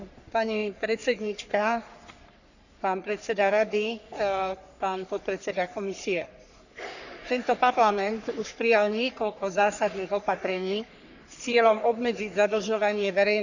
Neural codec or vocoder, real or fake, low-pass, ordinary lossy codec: codec, 44.1 kHz, 3.4 kbps, Pupu-Codec; fake; 7.2 kHz; none